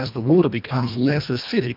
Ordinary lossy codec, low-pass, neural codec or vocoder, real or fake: MP3, 48 kbps; 5.4 kHz; codec, 24 kHz, 1.5 kbps, HILCodec; fake